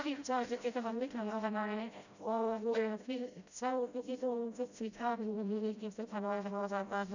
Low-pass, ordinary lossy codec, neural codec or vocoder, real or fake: 7.2 kHz; none; codec, 16 kHz, 0.5 kbps, FreqCodec, smaller model; fake